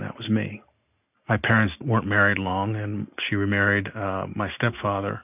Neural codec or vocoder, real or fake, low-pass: none; real; 3.6 kHz